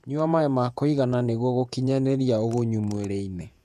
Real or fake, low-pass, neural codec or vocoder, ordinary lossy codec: fake; 14.4 kHz; vocoder, 48 kHz, 128 mel bands, Vocos; none